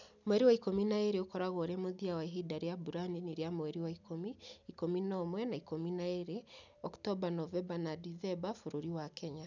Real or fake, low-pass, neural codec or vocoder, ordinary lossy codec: real; 7.2 kHz; none; none